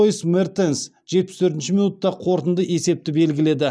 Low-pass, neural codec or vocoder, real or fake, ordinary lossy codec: none; none; real; none